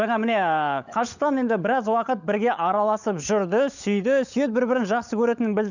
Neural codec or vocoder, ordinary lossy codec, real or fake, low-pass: codec, 16 kHz, 16 kbps, FunCodec, trained on LibriTTS, 50 frames a second; none; fake; 7.2 kHz